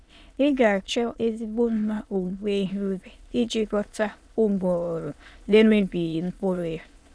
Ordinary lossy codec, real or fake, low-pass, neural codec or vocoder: none; fake; none; autoencoder, 22.05 kHz, a latent of 192 numbers a frame, VITS, trained on many speakers